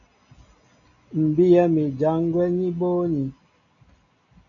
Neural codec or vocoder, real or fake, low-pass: none; real; 7.2 kHz